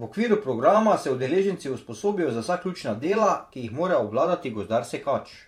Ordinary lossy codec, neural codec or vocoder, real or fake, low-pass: MP3, 64 kbps; vocoder, 44.1 kHz, 128 mel bands every 512 samples, BigVGAN v2; fake; 19.8 kHz